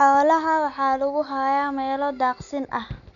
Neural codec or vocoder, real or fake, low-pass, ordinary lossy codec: none; real; 7.2 kHz; none